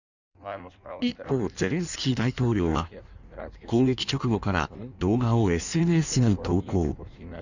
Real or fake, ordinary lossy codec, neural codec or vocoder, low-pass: fake; none; codec, 16 kHz in and 24 kHz out, 1.1 kbps, FireRedTTS-2 codec; 7.2 kHz